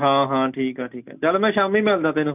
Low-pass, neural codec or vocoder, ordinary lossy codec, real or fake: 3.6 kHz; none; none; real